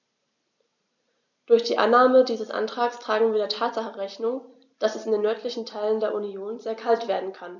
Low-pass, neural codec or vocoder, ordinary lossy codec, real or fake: 7.2 kHz; none; none; real